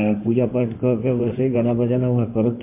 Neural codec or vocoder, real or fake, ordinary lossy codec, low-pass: codec, 16 kHz in and 24 kHz out, 2.2 kbps, FireRedTTS-2 codec; fake; MP3, 32 kbps; 3.6 kHz